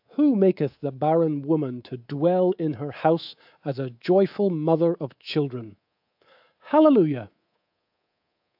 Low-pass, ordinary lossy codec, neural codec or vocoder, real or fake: 5.4 kHz; AAC, 48 kbps; codec, 24 kHz, 3.1 kbps, DualCodec; fake